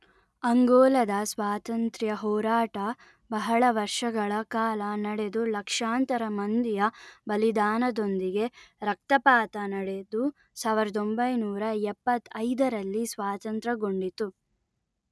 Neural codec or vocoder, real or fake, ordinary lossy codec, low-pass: none; real; none; none